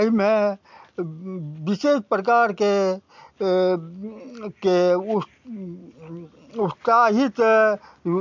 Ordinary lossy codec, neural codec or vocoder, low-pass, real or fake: MP3, 64 kbps; none; 7.2 kHz; real